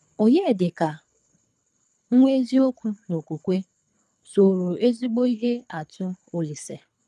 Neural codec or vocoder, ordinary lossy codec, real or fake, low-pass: codec, 24 kHz, 3 kbps, HILCodec; none; fake; none